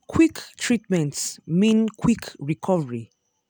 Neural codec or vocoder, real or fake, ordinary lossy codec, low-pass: none; real; none; none